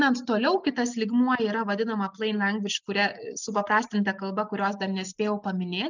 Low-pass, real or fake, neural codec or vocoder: 7.2 kHz; real; none